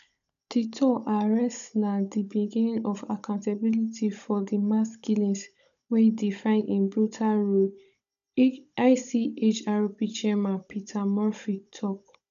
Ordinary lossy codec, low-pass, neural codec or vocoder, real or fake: none; 7.2 kHz; codec, 16 kHz, 16 kbps, FunCodec, trained on Chinese and English, 50 frames a second; fake